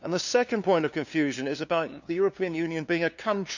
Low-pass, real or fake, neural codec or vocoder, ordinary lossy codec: 7.2 kHz; fake; codec, 16 kHz, 2 kbps, FunCodec, trained on LibriTTS, 25 frames a second; none